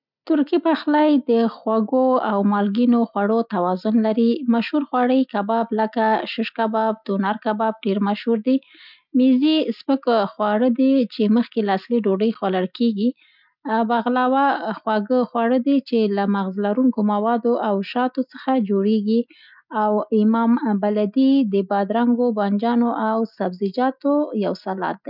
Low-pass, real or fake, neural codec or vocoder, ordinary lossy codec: 5.4 kHz; real; none; none